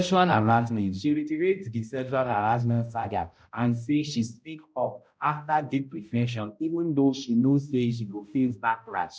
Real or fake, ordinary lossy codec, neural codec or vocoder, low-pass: fake; none; codec, 16 kHz, 0.5 kbps, X-Codec, HuBERT features, trained on balanced general audio; none